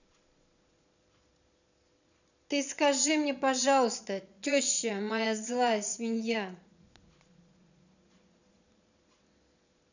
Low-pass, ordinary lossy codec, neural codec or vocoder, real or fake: 7.2 kHz; none; vocoder, 22.05 kHz, 80 mel bands, Vocos; fake